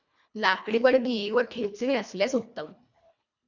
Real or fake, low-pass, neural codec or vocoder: fake; 7.2 kHz; codec, 24 kHz, 1.5 kbps, HILCodec